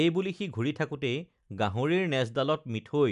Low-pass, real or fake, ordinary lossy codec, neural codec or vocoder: 10.8 kHz; real; none; none